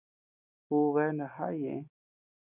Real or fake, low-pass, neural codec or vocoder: real; 3.6 kHz; none